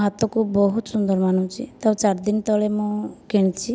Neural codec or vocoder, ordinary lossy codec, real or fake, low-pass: none; none; real; none